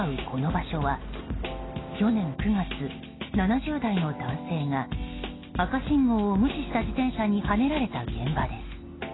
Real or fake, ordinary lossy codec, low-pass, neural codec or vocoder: real; AAC, 16 kbps; 7.2 kHz; none